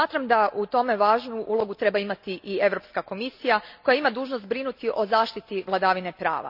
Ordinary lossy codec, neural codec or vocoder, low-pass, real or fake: none; none; 5.4 kHz; real